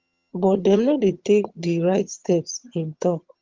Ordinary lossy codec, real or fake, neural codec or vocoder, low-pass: Opus, 32 kbps; fake; vocoder, 22.05 kHz, 80 mel bands, HiFi-GAN; 7.2 kHz